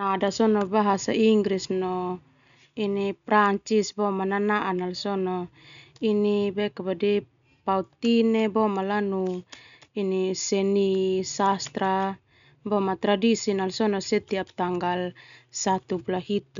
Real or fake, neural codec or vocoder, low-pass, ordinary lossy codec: real; none; 7.2 kHz; none